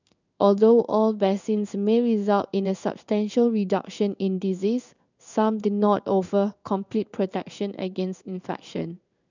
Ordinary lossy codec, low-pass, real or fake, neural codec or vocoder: none; 7.2 kHz; fake; codec, 16 kHz in and 24 kHz out, 1 kbps, XY-Tokenizer